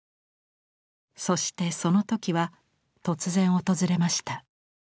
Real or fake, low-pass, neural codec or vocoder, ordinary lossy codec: real; none; none; none